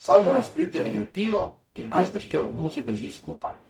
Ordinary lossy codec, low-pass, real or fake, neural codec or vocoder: none; 19.8 kHz; fake; codec, 44.1 kHz, 0.9 kbps, DAC